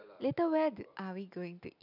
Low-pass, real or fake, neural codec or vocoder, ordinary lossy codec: 5.4 kHz; real; none; none